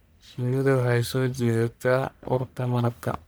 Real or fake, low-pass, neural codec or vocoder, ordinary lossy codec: fake; none; codec, 44.1 kHz, 1.7 kbps, Pupu-Codec; none